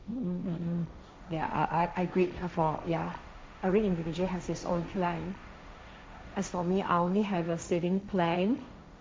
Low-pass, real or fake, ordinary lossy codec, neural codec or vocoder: none; fake; none; codec, 16 kHz, 1.1 kbps, Voila-Tokenizer